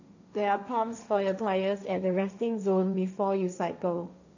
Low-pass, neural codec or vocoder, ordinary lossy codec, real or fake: 7.2 kHz; codec, 16 kHz, 1.1 kbps, Voila-Tokenizer; none; fake